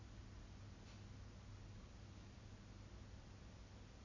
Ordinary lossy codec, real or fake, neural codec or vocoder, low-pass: AAC, 48 kbps; real; none; 7.2 kHz